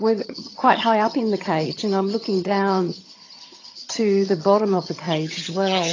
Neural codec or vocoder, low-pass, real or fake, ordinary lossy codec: vocoder, 22.05 kHz, 80 mel bands, HiFi-GAN; 7.2 kHz; fake; AAC, 32 kbps